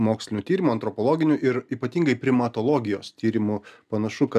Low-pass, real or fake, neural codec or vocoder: 14.4 kHz; real; none